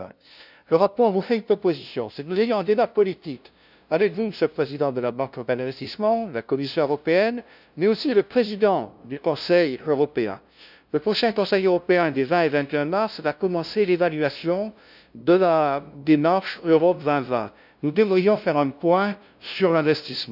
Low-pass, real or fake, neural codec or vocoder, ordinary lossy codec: 5.4 kHz; fake; codec, 16 kHz, 0.5 kbps, FunCodec, trained on LibriTTS, 25 frames a second; none